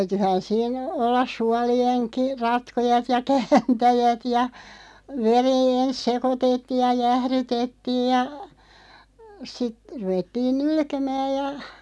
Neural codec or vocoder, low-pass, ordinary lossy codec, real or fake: none; none; none; real